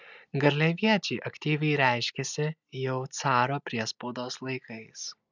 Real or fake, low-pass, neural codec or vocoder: real; 7.2 kHz; none